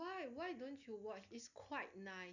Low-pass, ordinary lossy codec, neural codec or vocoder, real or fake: 7.2 kHz; none; none; real